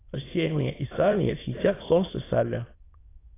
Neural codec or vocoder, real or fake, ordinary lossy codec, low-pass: autoencoder, 22.05 kHz, a latent of 192 numbers a frame, VITS, trained on many speakers; fake; AAC, 16 kbps; 3.6 kHz